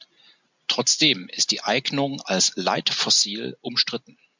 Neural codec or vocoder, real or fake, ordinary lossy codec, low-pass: none; real; MP3, 64 kbps; 7.2 kHz